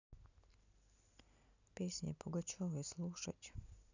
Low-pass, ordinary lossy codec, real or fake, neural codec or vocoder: 7.2 kHz; none; real; none